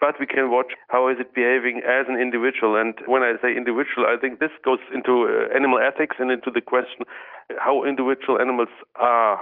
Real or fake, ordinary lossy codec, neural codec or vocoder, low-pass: real; Opus, 32 kbps; none; 5.4 kHz